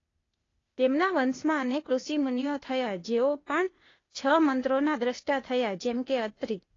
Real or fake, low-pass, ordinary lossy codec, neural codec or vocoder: fake; 7.2 kHz; AAC, 32 kbps; codec, 16 kHz, 0.8 kbps, ZipCodec